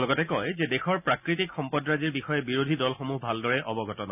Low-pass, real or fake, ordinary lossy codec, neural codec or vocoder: 3.6 kHz; real; none; none